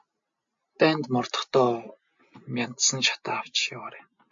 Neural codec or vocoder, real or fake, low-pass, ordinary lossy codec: none; real; 7.2 kHz; AAC, 64 kbps